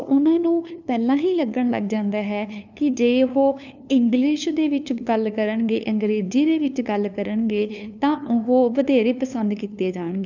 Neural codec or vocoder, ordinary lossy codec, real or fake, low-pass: codec, 16 kHz, 2 kbps, FunCodec, trained on LibriTTS, 25 frames a second; none; fake; 7.2 kHz